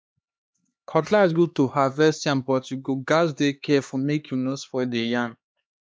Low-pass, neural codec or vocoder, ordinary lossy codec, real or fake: none; codec, 16 kHz, 2 kbps, X-Codec, HuBERT features, trained on LibriSpeech; none; fake